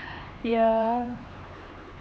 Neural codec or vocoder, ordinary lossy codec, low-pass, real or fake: codec, 16 kHz, 4 kbps, X-Codec, HuBERT features, trained on LibriSpeech; none; none; fake